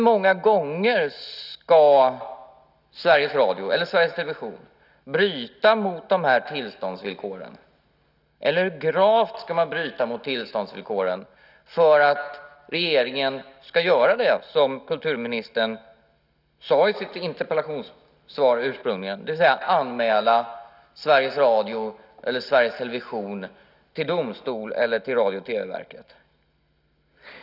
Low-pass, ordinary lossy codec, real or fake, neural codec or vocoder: 5.4 kHz; none; real; none